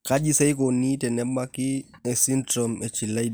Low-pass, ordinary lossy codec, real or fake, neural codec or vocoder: none; none; real; none